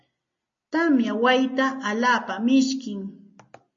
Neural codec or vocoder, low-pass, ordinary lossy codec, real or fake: none; 7.2 kHz; MP3, 32 kbps; real